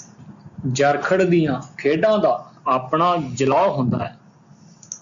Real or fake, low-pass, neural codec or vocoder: real; 7.2 kHz; none